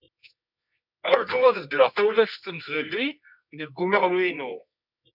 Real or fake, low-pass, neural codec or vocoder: fake; 5.4 kHz; codec, 24 kHz, 0.9 kbps, WavTokenizer, medium music audio release